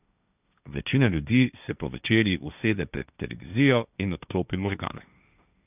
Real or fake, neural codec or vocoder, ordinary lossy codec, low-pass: fake; codec, 16 kHz, 1.1 kbps, Voila-Tokenizer; none; 3.6 kHz